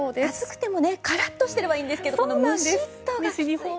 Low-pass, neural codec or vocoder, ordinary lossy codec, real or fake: none; none; none; real